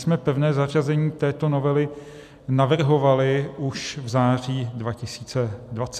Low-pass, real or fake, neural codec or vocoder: 14.4 kHz; real; none